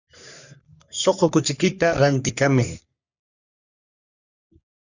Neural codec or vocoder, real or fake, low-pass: codec, 44.1 kHz, 3.4 kbps, Pupu-Codec; fake; 7.2 kHz